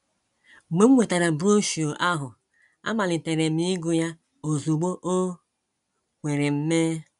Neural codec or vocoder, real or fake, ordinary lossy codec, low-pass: none; real; none; 10.8 kHz